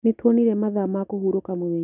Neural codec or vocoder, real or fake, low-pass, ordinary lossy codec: none; real; 3.6 kHz; none